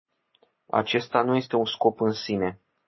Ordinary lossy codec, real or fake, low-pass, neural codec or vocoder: MP3, 24 kbps; real; 7.2 kHz; none